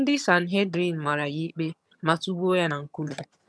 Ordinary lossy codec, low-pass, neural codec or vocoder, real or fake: none; none; vocoder, 22.05 kHz, 80 mel bands, HiFi-GAN; fake